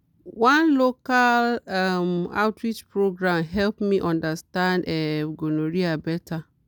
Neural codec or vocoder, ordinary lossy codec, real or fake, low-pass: none; none; real; none